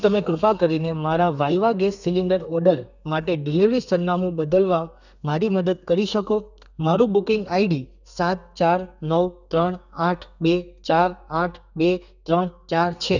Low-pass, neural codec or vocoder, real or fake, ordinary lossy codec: 7.2 kHz; codec, 32 kHz, 1.9 kbps, SNAC; fake; none